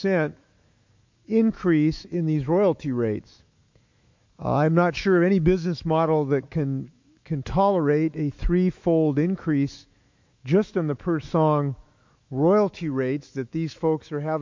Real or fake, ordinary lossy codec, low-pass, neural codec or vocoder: fake; MP3, 48 kbps; 7.2 kHz; autoencoder, 48 kHz, 128 numbers a frame, DAC-VAE, trained on Japanese speech